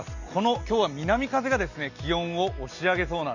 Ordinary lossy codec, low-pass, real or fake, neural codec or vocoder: none; 7.2 kHz; real; none